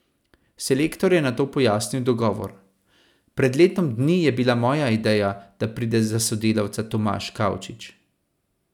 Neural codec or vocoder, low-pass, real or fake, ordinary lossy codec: none; 19.8 kHz; real; none